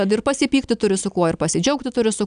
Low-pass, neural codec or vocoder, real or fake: 9.9 kHz; none; real